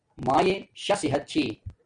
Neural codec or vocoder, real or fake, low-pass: none; real; 9.9 kHz